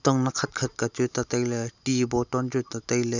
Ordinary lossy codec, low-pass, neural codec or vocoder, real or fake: none; 7.2 kHz; none; real